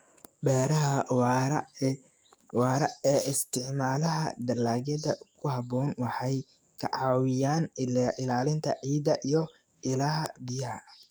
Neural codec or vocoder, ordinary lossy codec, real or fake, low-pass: codec, 44.1 kHz, 7.8 kbps, DAC; none; fake; none